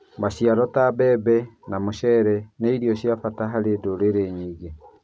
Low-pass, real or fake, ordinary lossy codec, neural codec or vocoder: none; real; none; none